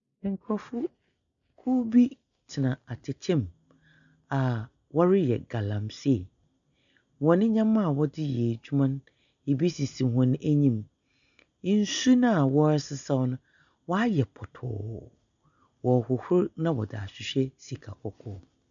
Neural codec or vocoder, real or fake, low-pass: none; real; 7.2 kHz